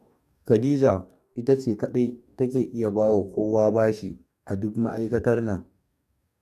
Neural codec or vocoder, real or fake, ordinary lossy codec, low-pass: codec, 44.1 kHz, 2.6 kbps, DAC; fake; none; 14.4 kHz